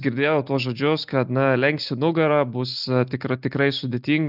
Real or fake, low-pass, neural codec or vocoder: real; 5.4 kHz; none